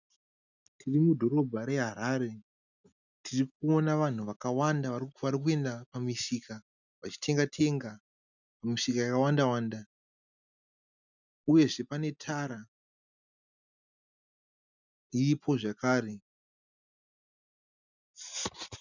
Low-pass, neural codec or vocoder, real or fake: 7.2 kHz; none; real